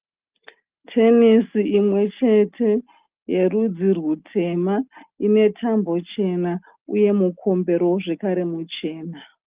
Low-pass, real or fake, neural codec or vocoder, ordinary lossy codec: 3.6 kHz; real; none; Opus, 32 kbps